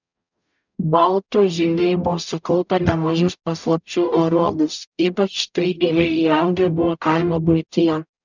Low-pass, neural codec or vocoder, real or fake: 7.2 kHz; codec, 44.1 kHz, 0.9 kbps, DAC; fake